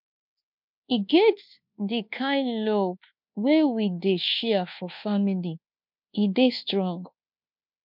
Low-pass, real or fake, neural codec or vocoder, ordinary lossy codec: 5.4 kHz; fake; codec, 24 kHz, 1.2 kbps, DualCodec; MP3, 48 kbps